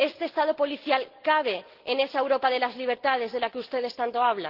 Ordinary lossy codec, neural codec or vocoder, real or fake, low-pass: Opus, 16 kbps; none; real; 5.4 kHz